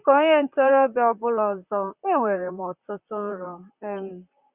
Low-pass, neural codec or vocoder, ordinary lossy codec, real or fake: 3.6 kHz; vocoder, 44.1 kHz, 128 mel bands, Pupu-Vocoder; Opus, 64 kbps; fake